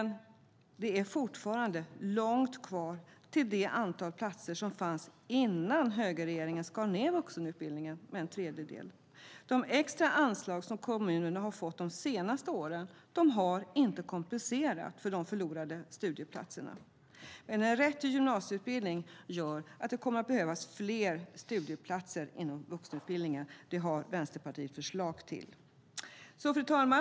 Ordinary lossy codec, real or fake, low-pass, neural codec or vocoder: none; real; none; none